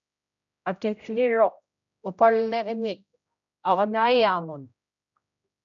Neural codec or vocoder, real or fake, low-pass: codec, 16 kHz, 0.5 kbps, X-Codec, HuBERT features, trained on general audio; fake; 7.2 kHz